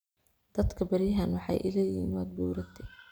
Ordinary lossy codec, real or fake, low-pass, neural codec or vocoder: none; real; none; none